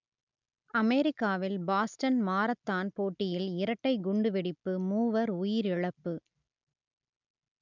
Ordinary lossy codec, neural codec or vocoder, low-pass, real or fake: none; none; 7.2 kHz; real